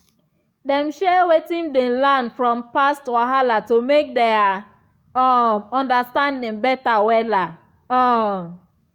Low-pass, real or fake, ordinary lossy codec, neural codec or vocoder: 19.8 kHz; fake; Opus, 64 kbps; codec, 44.1 kHz, 7.8 kbps, DAC